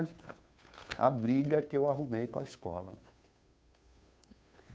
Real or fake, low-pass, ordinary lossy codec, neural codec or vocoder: fake; none; none; codec, 16 kHz, 2 kbps, FunCodec, trained on Chinese and English, 25 frames a second